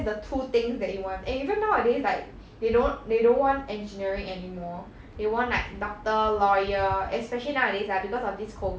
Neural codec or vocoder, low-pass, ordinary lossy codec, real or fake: none; none; none; real